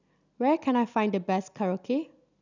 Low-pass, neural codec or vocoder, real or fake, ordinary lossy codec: 7.2 kHz; none; real; none